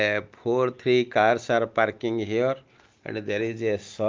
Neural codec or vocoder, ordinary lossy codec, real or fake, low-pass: none; Opus, 32 kbps; real; 7.2 kHz